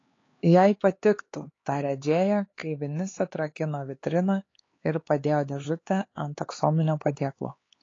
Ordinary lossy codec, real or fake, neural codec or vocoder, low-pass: AAC, 32 kbps; fake; codec, 16 kHz, 4 kbps, X-Codec, HuBERT features, trained on LibriSpeech; 7.2 kHz